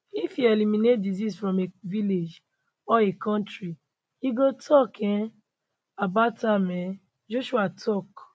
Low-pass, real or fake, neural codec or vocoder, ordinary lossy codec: none; real; none; none